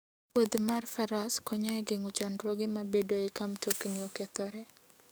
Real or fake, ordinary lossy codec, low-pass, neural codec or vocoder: fake; none; none; codec, 44.1 kHz, 7.8 kbps, DAC